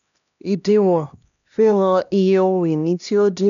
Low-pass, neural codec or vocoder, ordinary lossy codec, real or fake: 7.2 kHz; codec, 16 kHz, 1 kbps, X-Codec, HuBERT features, trained on LibriSpeech; none; fake